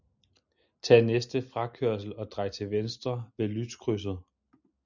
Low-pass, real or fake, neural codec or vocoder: 7.2 kHz; real; none